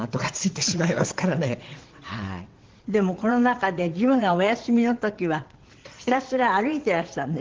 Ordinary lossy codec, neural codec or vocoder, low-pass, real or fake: Opus, 16 kbps; vocoder, 22.05 kHz, 80 mel bands, WaveNeXt; 7.2 kHz; fake